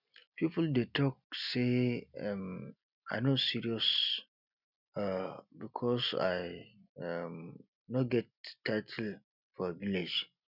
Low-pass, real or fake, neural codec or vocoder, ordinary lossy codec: 5.4 kHz; real; none; none